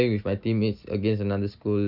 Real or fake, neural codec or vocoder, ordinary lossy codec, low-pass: real; none; Opus, 64 kbps; 5.4 kHz